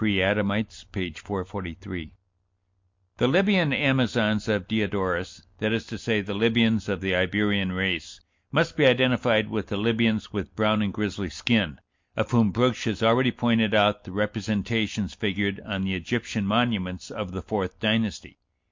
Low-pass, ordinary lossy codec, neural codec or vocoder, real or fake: 7.2 kHz; MP3, 48 kbps; none; real